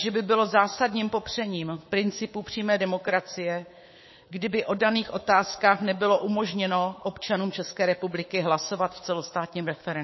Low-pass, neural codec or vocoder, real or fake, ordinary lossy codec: 7.2 kHz; codec, 24 kHz, 3.1 kbps, DualCodec; fake; MP3, 24 kbps